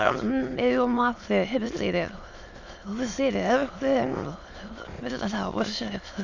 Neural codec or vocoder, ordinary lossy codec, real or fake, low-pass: autoencoder, 22.05 kHz, a latent of 192 numbers a frame, VITS, trained on many speakers; Opus, 64 kbps; fake; 7.2 kHz